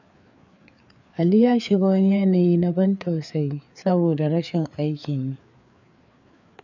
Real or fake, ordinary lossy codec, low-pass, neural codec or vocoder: fake; none; 7.2 kHz; codec, 16 kHz, 4 kbps, FreqCodec, larger model